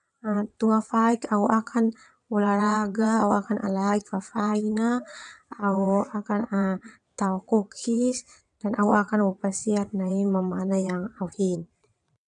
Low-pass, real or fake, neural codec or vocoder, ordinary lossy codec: 9.9 kHz; fake; vocoder, 22.05 kHz, 80 mel bands, WaveNeXt; none